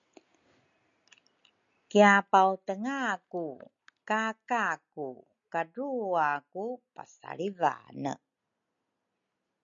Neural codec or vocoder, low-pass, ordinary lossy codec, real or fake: none; 7.2 kHz; MP3, 96 kbps; real